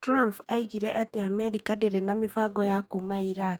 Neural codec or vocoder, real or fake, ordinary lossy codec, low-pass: codec, 44.1 kHz, 2.6 kbps, DAC; fake; none; none